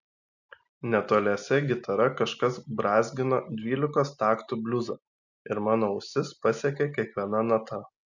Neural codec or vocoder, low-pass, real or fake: none; 7.2 kHz; real